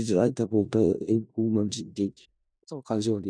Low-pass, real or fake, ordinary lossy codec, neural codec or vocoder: 9.9 kHz; fake; none; codec, 16 kHz in and 24 kHz out, 0.4 kbps, LongCat-Audio-Codec, four codebook decoder